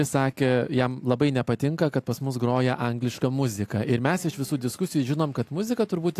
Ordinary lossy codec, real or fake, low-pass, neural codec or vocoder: AAC, 64 kbps; real; 14.4 kHz; none